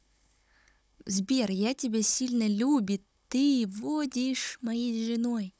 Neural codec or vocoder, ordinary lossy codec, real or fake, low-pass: codec, 16 kHz, 16 kbps, FunCodec, trained on Chinese and English, 50 frames a second; none; fake; none